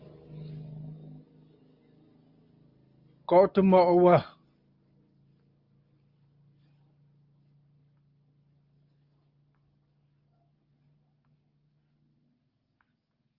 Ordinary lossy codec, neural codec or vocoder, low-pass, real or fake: Opus, 32 kbps; none; 5.4 kHz; real